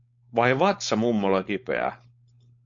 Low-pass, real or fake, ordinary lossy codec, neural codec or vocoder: 7.2 kHz; fake; MP3, 48 kbps; codec, 16 kHz, 4.8 kbps, FACodec